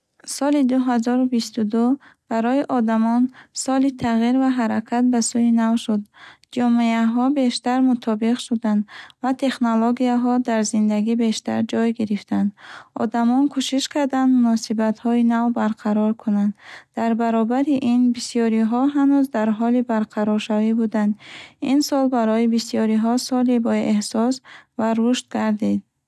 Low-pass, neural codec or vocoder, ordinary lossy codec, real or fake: none; none; none; real